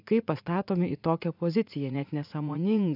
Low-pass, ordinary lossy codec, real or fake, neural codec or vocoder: 5.4 kHz; AAC, 48 kbps; fake; vocoder, 44.1 kHz, 80 mel bands, Vocos